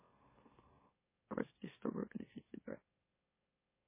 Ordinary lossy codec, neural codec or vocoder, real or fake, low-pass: MP3, 16 kbps; autoencoder, 44.1 kHz, a latent of 192 numbers a frame, MeloTTS; fake; 3.6 kHz